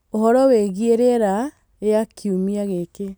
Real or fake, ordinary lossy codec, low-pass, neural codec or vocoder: real; none; none; none